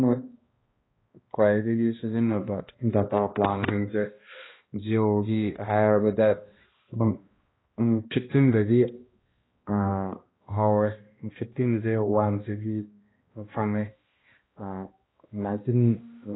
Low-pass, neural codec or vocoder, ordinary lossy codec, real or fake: 7.2 kHz; codec, 16 kHz, 1 kbps, X-Codec, HuBERT features, trained on balanced general audio; AAC, 16 kbps; fake